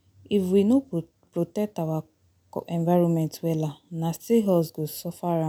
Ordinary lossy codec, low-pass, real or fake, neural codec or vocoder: none; 19.8 kHz; real; none